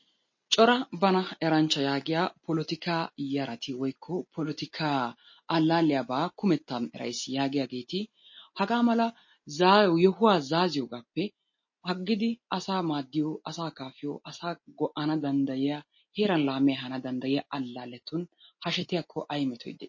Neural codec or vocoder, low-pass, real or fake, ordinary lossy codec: none; 7.2 kHz; real; MP3, 32 kbps